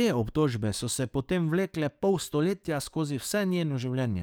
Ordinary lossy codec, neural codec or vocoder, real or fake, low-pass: none; codec, 44.1 kHz, 7.8 kbps, DAC; fake; none